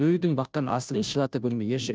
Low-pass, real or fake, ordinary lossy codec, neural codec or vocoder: none; fake; none; codec, 16 kHz, 0.5 kbps, FunCodec, trained on Chinese and English, 25 frames a second